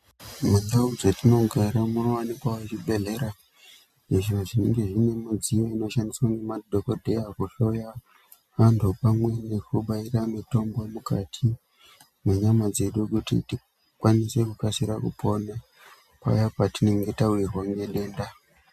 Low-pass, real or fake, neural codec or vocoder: 14.4 kHz; real; none